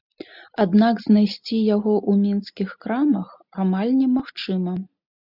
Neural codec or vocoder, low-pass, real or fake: none; 5.4 kHz; real